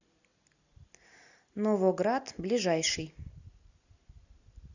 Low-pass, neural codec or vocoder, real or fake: 7.2 kHz; none; real